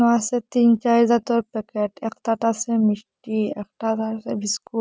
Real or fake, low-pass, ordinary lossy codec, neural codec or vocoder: real; none; none; none